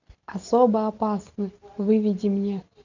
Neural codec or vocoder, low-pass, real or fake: none; 7.2 kHz; real